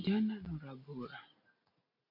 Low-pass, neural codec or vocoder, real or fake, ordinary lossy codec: 5.4 kHz; none; real; MP3, 32 kbps